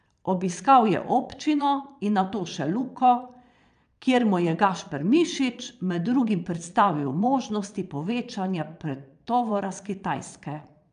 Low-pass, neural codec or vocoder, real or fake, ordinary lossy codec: 9.9 kHz; vocoder, 22.05 kHz, 80 mel bands, Vocos; fake; none